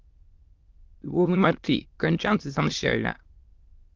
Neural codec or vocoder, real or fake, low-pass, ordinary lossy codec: autoencoder, 22.05 kHz, a latent of 192 numbers a frame, VITS, trained on many speakers; fake; 7.2 kHz; Opus, 24 kbps